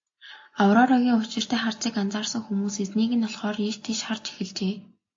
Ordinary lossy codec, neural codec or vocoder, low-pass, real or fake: AAC, 48 kbps; none; 7.2 kHz; real